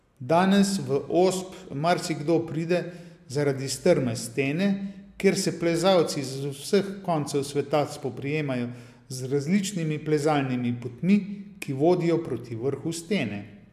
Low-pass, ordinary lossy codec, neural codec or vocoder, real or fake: 14.4 kHz; AAC, 96 kbps; none; real